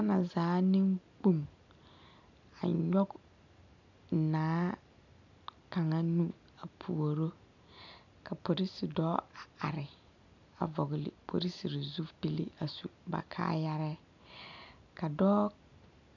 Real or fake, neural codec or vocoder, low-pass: real; none; 7.2 kHz